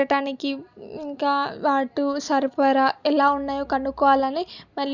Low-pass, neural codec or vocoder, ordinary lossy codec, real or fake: 7.2 kHz; none; none; real